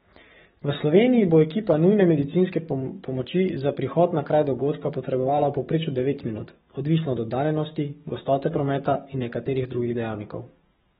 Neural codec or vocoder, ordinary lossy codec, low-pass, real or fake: codec, 44.1 kHz, 7.8 kbps, DAC; AAC, 16 kbps; 19.8 kHz; fake